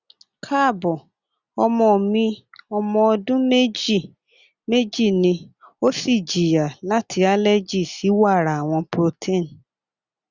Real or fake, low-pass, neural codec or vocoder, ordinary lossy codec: real; 7.2 kHz; none; Opus, 64 kbps